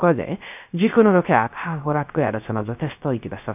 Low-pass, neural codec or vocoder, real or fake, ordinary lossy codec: 3.6 kHz; codec, 16 kHz, 0.3 kbps, FocalCodec; fake; none